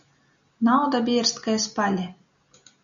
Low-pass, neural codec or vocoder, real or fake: 7.2 kHz; none; real